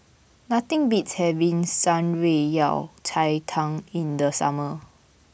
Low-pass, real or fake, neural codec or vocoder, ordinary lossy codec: none; real; none; none